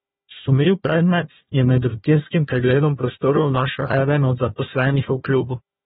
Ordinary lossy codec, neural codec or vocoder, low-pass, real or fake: AAC, 16 kbps; codec, 16 kHz, 1 kbps, FunCodec, trained on Chinese and English, 50 frames a second; 7.2 kHz; fake